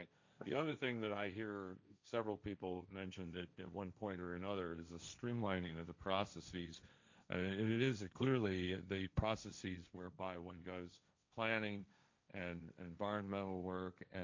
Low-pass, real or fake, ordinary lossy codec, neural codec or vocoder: 7.2 kHz; fake; MP3, 64 kbps; codec, 16 kHz, 1.1 kbps, Voila-Tokenizer